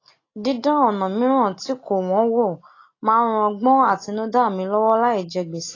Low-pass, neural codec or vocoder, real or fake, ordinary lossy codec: 7.2 kHz; none; real; AAC, 32 kbps